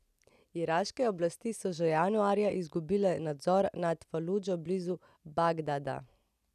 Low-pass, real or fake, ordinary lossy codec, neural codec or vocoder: 14.4 kHz; real; none; none